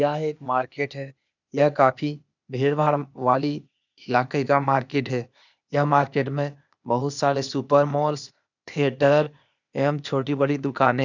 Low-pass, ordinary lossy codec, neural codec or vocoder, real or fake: 7.2 kHz; none; codec, 16 kHz, 0.8 kbps, ZipCodec; fake